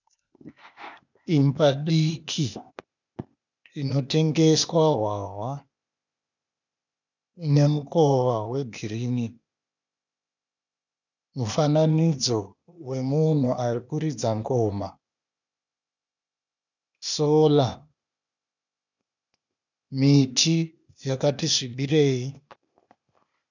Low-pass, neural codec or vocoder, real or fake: 7.2 kHz; codec, 16 kHz, 0.8 kbps, ZipCodec; fake